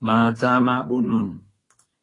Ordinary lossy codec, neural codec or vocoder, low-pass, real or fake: AAC, 32 kbps; codec, 24 kHz, 1 kbps, SNAC; 10.8 kHz; fake